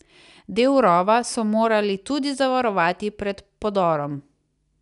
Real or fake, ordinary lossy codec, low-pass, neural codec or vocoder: real; none; 10.8 kHz; none